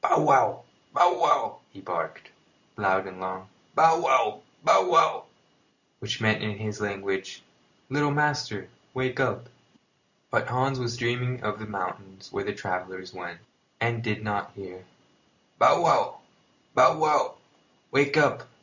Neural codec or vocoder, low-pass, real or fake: none; 7.2 kHz; real